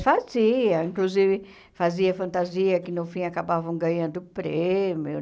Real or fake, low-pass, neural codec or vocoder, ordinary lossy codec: real; none; none; none